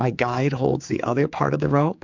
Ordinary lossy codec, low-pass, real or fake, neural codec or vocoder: MP3, 64 kbps; 7.2 kHz; fake; codec, 16 kHz, 4 kbps, X-Codec, HuBERT features, trained on general audio